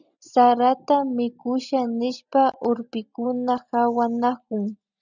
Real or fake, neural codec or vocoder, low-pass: real; none; 7.2 kHz